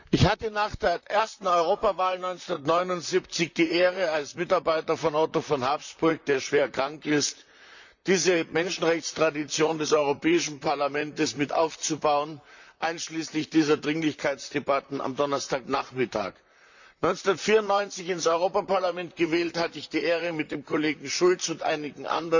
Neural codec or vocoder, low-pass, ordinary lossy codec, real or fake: vocoder, 44.1 kHz, 128 mel bands, Pupu-Vocoder; 7.2 kHz; none; fake